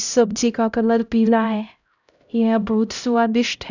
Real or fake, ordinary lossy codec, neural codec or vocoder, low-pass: fake; none; codec, 16 kHz, 0.5 kbps, X-Codec, HuBERT features, trained on LibriSpeech; 7.2 kHz